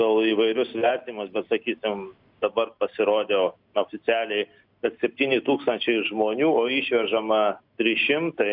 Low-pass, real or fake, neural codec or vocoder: 5.4 kHz; real; none